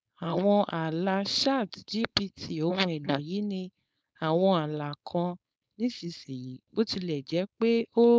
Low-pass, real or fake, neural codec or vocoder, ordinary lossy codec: none; fake; codec, 16 kHz, 4.8 kbps, FACodec; none